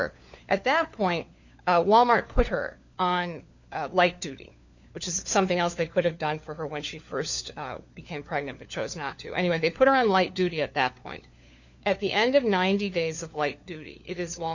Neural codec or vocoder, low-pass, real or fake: codec, 16 kHz, 4 kbps, FunCodec, trained on LibriTTS, 50 frames a second; 7.2 kHz; fake